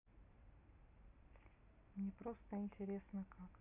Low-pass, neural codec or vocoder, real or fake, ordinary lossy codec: 3.6 kHz; none; real; none